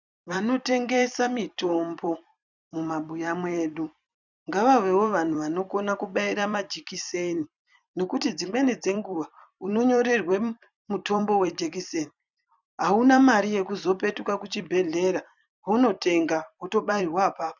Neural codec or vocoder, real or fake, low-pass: vocoder, 44.1 kHz, 128 mel bands every 256 samples, BigVGAN v2; fake; 7.2 kHz